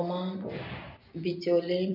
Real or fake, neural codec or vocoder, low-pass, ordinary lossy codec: real; none; 5.4 kHz; none